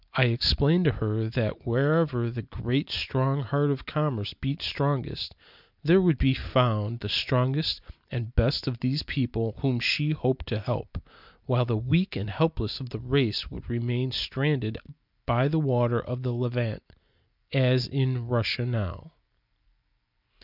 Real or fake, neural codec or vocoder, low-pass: real; none; 5.4 kHz